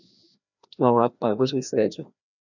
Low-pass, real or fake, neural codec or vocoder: 7.2 kHz; fake; codec, 16 kHz, 1 kbps, FreqCodec, larger model